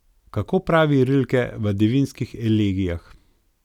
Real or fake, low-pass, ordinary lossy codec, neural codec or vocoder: real; 19.8 kHz; none; none